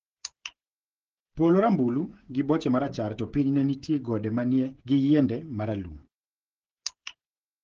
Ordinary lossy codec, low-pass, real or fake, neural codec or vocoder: Opus, 16 kbps; 7.2 kHz; fake; codec, 16 kHz, 16 kbps, FreqCodec, smaller model